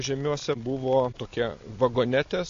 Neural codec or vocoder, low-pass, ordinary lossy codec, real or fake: none; 7.2 kHz; AAC, 48 kbps; real